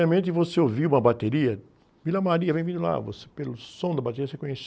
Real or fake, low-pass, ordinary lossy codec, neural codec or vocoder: real; none; none; none